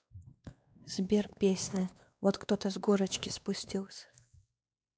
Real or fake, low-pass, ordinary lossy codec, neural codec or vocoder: fake; none; none; codec, 16 kHz, 2 kbps, X-Codec, WavLM features, trained on Multilingual LibriSpeech